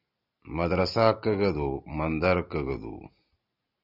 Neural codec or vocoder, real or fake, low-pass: none; real; 5.4 kHz